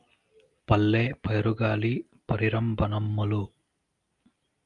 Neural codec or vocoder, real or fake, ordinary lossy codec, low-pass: none; real; Opus, 32 kbps; 10.8 kHz